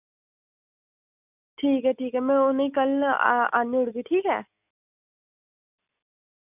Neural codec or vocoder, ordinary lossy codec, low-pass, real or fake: none; none; 3.6 kHz; real